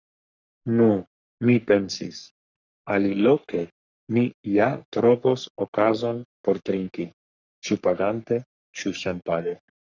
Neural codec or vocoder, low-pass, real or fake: codec, 44.1 kHz, 3.4 kbps, Pupu-Codec; 7.2 kHz; fake